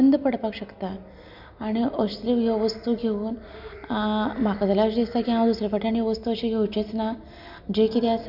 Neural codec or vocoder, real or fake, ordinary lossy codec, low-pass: none; real; none; 5.4 kHz